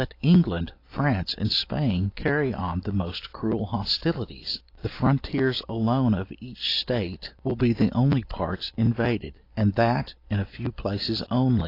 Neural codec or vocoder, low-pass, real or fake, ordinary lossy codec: none; 5.4 kHz; real; AAC, 32 kbps